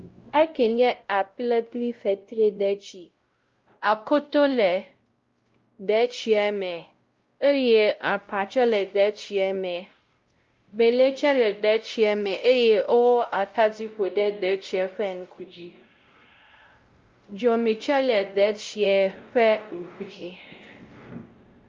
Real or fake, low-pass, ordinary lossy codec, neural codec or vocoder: fake; 7.2 kHz; Opus, 32 kbps; codec, 16 kHz, 0.5 kbps, X-Codec, WavLM features, trained on Multilingual LibriSpeech